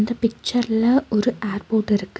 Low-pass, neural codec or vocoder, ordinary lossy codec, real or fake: none; none; none; real